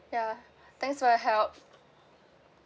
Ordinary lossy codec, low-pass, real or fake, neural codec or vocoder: none; none; real; none